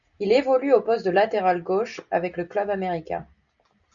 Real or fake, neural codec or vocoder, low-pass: real; none; 7.2 kHz